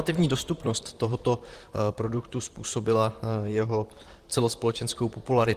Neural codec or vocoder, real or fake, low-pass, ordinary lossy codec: vocoder, 44.1 kHz, 128 mel bands, Pupu-Vocoder; fake; 14.4 kHz; Opus, 24 kbps